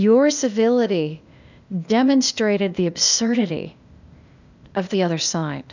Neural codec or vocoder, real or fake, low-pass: codec, 16 kHz, 0.8 kbps, ZipCodec; fake; 7.2 kHz